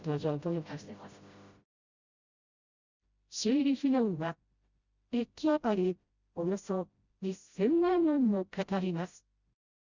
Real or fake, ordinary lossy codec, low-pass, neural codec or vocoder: fake; Opus, 64 kbps; 7.2 kHz; codec, 16 kHz, 0.5 kbps, FreqCodec, smaller model